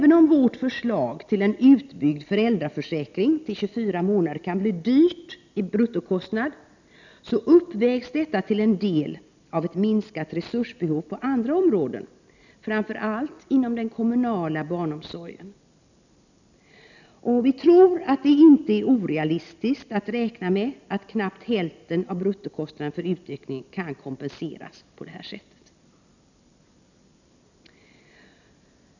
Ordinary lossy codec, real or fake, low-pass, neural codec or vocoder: none; real; 7.2 kHz; none